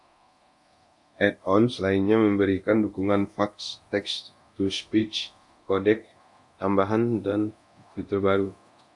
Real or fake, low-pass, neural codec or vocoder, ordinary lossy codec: fake; 10.8 kHz; codec, 24 kHz, 0.9 kbps, DualCodec; AAC, 64 kbps